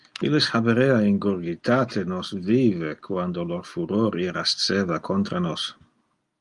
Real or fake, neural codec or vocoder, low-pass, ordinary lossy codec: real; none; 10.8 kHz; Opus, 32 kbps